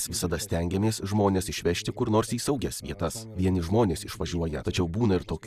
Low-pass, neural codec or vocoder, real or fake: 14.4 kHz; none; real